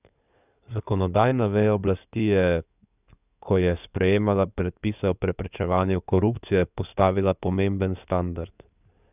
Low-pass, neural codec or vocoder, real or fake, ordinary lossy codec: 3.6 kHz; codec, 16 kHz in and 24 kHz out, 2.2 kbps, FireRedTTS-2 codec; fake; none